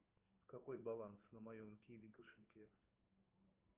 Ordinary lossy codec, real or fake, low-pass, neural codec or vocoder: Opus, 32 kbps; fake; 3.6 kHz; codec, 16 kHz in and 24 kHz out, 1 kbps, XY-Tokenizer